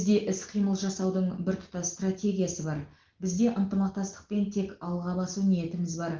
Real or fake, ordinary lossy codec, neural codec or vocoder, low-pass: real; Opus, 16 kbps; none; 7.2 kHz